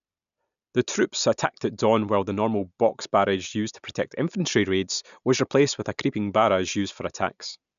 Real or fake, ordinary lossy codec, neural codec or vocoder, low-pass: real; none; none; 7.2 kHz